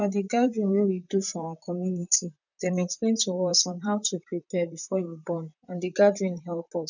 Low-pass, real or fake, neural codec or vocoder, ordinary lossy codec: 7.2 kHz; fake; vocoder, 44.1 kHz, 128 mel bands, Pupu-Vocoder; none